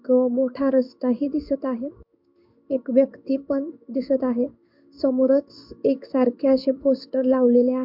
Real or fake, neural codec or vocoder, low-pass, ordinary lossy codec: fake; codec, 16 kHz in and 24 kHz out, 1 kbps, XY-Tokenizer; 5.4 kHz; MP3, 48 kbps